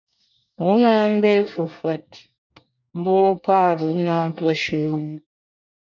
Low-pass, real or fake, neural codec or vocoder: 7.2 kHz; fake; codec, 24 kHz, 1 kbps, SNAC